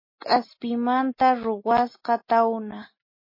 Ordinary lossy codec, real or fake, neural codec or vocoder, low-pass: MP3, 24 kbps; real; none; 5.4 kHz